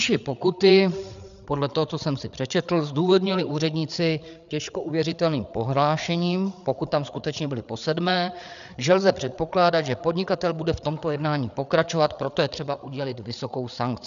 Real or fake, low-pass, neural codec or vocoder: fake; 7.2 kHz; codec, 16 kHz, 8 kbps, FreqCodec, larger model